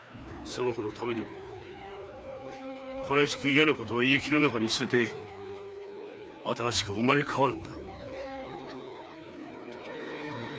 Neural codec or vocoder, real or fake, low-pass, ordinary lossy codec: codec, 16 kHz, 2 kbps, FreqCodec, larger model; fake; none; none